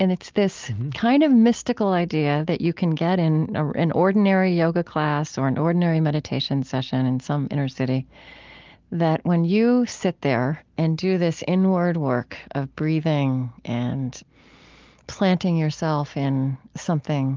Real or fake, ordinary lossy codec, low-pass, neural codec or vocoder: real; Opus, 32 kbps; 7.2 kHz; none